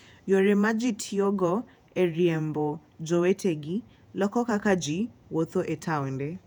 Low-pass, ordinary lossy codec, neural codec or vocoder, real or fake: 19.8 kHz; none; vocoder, 48 kHz, 128 mel bands, Vocos; fake